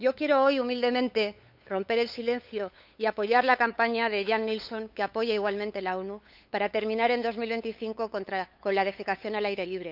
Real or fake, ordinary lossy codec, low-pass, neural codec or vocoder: fake; none; 5.4 kHz; codec, 16 kHz, 8 kbps, FunCodec, trained on LibriTTS, 25 frames a second